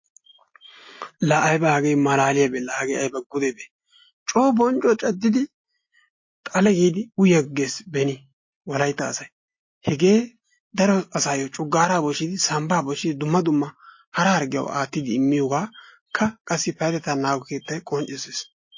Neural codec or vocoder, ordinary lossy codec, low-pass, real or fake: none; MP3, 32 kbps; 7.2 kHz; real